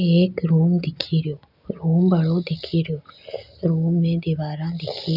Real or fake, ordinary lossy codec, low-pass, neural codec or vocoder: real; none; 5.4 kHz; none